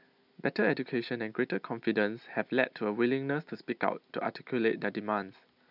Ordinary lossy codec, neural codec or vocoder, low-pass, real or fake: none; none; 5.4 kHz; real